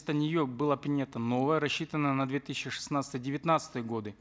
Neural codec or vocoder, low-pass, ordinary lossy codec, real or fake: none; none; none; real